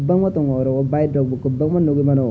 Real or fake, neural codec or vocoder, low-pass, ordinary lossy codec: real; none; none; none